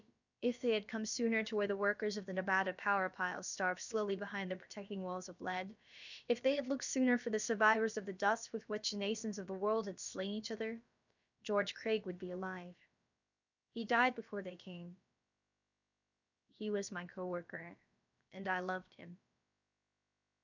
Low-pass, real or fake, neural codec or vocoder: 7.2 kHz; fake; codec, 16 kHz, about 1 kbps, DyCAST, with the encoder's durations